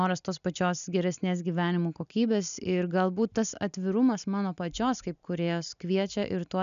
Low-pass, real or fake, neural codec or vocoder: 7.2 kHz; real; none